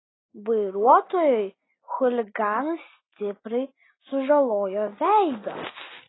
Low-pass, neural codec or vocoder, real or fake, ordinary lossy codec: 7.2 kHz; none; real; AAC, 16 kbps